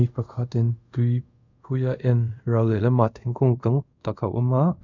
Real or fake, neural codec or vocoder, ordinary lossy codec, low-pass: fake; codec, 24 kHz, 0.5 kbps, DualCodec; MP3, 64 kbps; 7.2 kHz